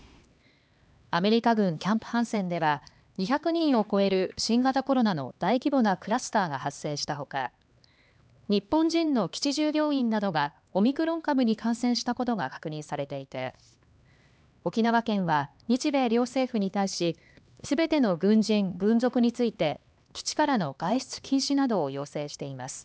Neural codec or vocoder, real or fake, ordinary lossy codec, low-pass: codec, 16 kHz, 2 kbps, X-Codec, HuBERT features, trained on LibriSpeech; fake; none; none